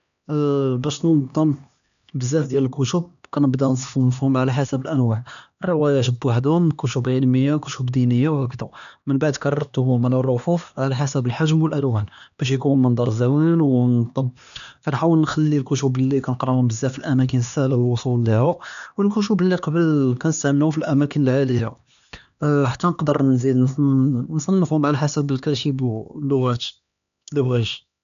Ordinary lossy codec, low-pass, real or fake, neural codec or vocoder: none; 7.2 kHz; fake; codec, 16 kHz, 2 kbps, X-Codec, HuBERT features, trained on LibriSpeech